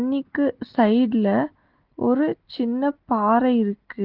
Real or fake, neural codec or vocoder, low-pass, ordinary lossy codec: real; none; 5.4 kHz; Opus, 16 kbps